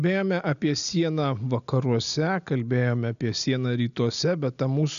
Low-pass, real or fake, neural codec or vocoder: 7.2 kHz; real; none